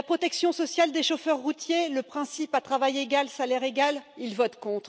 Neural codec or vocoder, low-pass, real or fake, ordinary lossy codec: none; none; real; none